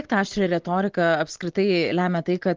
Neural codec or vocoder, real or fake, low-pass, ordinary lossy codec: none; real; 7.2 kHz; Opus, 16 kbps